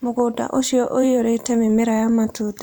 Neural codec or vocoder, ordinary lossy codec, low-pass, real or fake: vocoder, 44.1 kHz, 128 mel bands every 512 samples, BigVGAN v2; none; none; fake